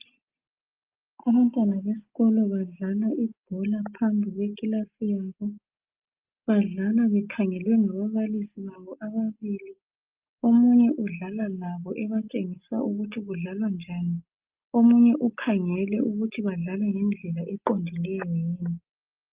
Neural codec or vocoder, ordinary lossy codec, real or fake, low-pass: none; Opus, 24 kbps; real; 3.6 kHz